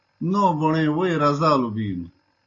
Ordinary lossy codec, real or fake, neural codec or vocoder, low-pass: AAC, 32 kbps; real; none; 7.2 kHz